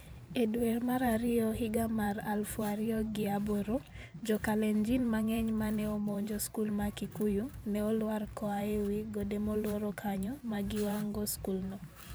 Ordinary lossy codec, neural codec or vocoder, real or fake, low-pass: none; vocoder, 44.1 kHz, 128 mel bands every 512 samples, BigVGAN v2; fake; none